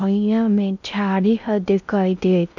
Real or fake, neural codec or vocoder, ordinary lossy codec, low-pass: fake; codec, 16 kHz in and 24 kHz out, 0.6 kbps, FocalCodec, streaming, 2048 codes; none; 7.2 kHz